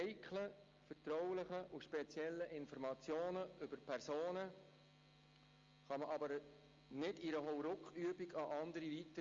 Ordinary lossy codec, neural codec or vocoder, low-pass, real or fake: Opus, 32 kbps; none; 7.2 kHz; real